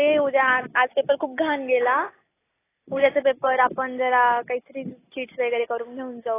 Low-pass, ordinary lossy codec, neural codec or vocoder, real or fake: 3.6 kHz; AAC, 16 kbps; none; real